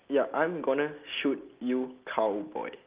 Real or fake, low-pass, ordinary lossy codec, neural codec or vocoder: real; 3.6 kHz; Opus, 32 kbps; none